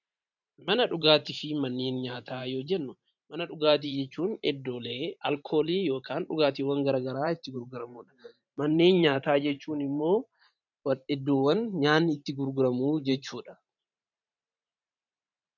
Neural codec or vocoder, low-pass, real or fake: vocoder, 44.1 kHz, 128 mel bands every 512 samples, BigVGAN v2; 7.2 kHz; fake